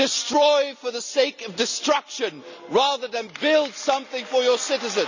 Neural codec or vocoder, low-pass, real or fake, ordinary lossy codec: none; 7.2 kHz; real; none